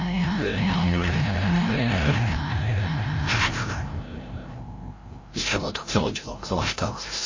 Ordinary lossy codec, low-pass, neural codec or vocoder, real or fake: MP3, 32 kbps; 7.2 kHz; codec, 16 kHz, 0.5 kbps, FreqCodec, larger model; fake